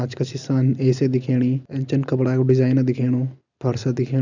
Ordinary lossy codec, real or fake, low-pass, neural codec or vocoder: none; real; 7.2 kHz; none